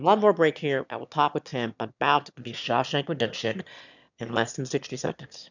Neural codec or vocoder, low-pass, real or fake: autoencoder, 22.05 kHz, a latent of 192 numbers a frame, VITS, trained on one speaker; 7.2 kHz; fake